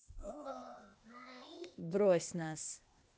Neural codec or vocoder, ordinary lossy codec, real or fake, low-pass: codec, 16 kHz, 0.8 kbps, ZipCodec; none; fake; none